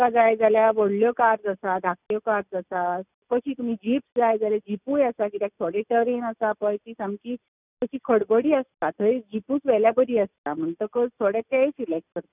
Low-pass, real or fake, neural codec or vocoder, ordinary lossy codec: 3.6 kHz; real; none; none